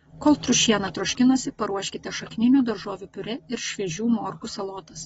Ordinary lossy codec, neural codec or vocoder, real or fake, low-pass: AAC, 24 kbps; none; real; 19.8 kHz